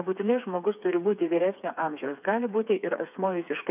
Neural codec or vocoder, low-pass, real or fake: codec, 16 kHz, 4 kbps, FreqCodec, smaller model; 3.6 kHz; fake